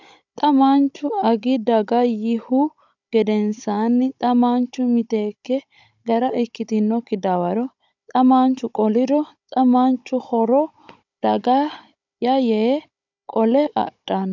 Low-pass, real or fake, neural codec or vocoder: 7.2 kHz; fake; codec, 16 kHz, 16 kbps, FunCodec, trained on Chinese and English, 50 frames a second